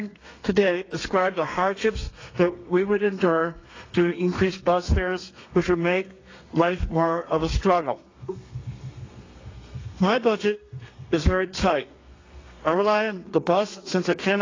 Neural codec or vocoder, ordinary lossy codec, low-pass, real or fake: codec, 32 kHz, 1.9 kbps, SNAC; AAC, 32 kbps; 7.2 kHz; fake